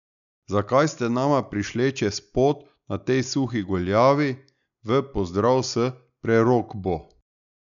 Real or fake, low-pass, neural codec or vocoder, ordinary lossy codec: real; 7.2 kHz; none; none